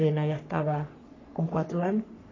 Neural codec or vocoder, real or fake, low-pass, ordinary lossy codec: codec, 44.1 kHz, 3.4 kbps, Pupu-Codec; fake; 7.2 kHz; AAC, 32 kbps